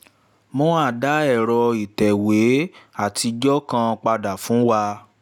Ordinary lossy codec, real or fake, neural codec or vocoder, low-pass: none; real; none; 19.8 kHz